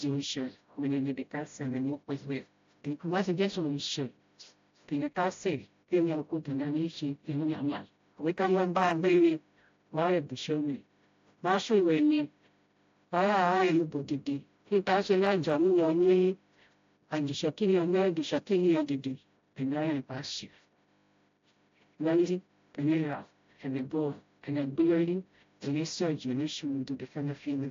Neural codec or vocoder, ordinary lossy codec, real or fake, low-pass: codec, 16 kHz, 0.5 kbps, FreqCodec, smaller model; AAC, 48 kbps; fake; 7.2 kHz